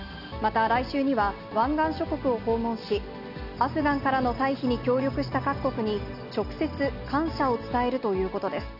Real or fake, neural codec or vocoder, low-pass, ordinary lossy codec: real; none; 5.4 kHz; none